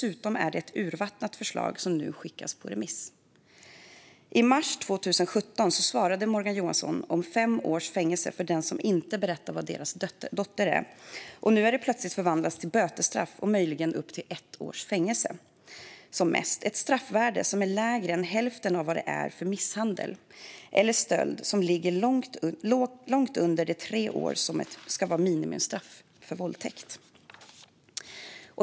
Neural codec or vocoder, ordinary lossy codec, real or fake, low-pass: none; none; real; none